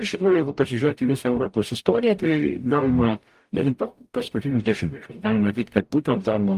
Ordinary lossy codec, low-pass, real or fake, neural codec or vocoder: Opus, 32 kbps; 14.4 kHz; fake; codec, 44.1 kHz, 0.9 kbps, DAC